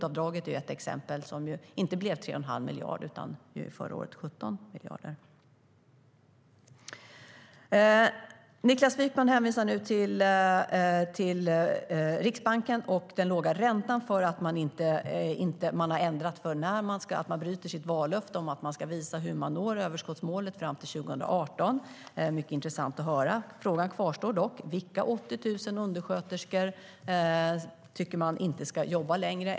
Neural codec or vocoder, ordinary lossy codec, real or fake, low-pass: none; none; real; none